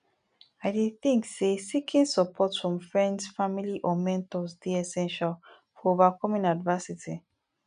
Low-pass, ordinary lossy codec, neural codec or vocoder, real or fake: 9.9 kHz; none; none; real